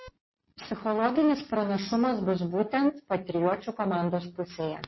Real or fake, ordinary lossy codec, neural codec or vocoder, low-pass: real; MP3, 24 kbps; none; 7.2 kHz